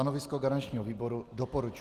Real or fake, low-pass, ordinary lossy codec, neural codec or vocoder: real; 14.4 kHz; Opus, 24 kbps; none